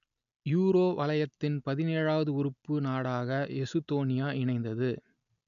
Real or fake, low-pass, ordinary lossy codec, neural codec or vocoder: real; 7.2 kHz; none; none